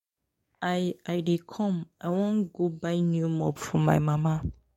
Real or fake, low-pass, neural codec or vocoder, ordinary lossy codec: fake; 19.8 kHz; codec, 44.1 kHz, 7.8 kbps, DAC; MP3, 64 kbps